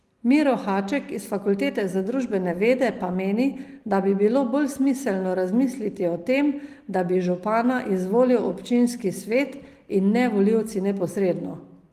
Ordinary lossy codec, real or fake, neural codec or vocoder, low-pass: Opus, 24 kbps; real; none; 14.4 kHz